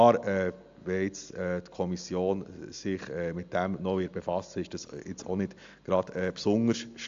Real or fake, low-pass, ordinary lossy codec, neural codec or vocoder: real; 7.2 kHz; AAC, 64 kbps; none